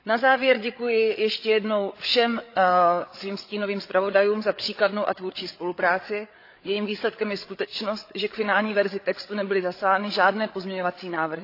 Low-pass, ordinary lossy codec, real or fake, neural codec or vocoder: 5.4 kHz; AAC, 32 kbps; fake; codec, 16 kHz, 8 kbps, FreqCodec, larger model